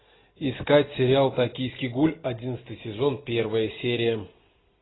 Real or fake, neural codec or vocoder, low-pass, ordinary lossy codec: real; none; 7.2 kHz; AAC, 16 kbps